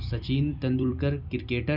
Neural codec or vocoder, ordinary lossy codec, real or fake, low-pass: vocoder, 44.1 kHz, 128 mel bands every 256 samples, BigVGAN v2; none; fake; 5.4 kHz